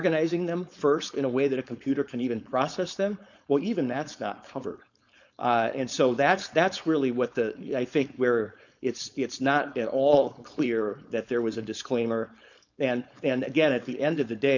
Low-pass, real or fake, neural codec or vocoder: 7.2 kHz; fake; codec, 16 kHz, 4.8 kbps, FACodec